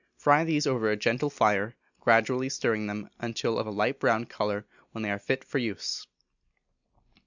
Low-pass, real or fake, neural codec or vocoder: 7.2 kHz; real; none